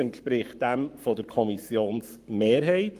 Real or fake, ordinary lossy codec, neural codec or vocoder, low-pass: fake; Opus, 32 kbps; codec, 44.1 kHz, 7.8 kbps, Pupu-Codec; 14.4 kHz